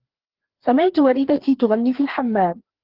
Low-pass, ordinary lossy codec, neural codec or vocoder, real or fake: 5.4 kHz; Opus, 16 kbps; codec, 16 kHz, 2 kbps, FreqCodec, larger model; fake